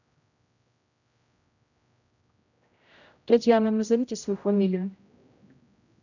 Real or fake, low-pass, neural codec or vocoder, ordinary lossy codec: fake; 7.2 kHz; codec, 16 kHz, 0.5 kbps, X-Codec, HuBERT features, trained on general audio; none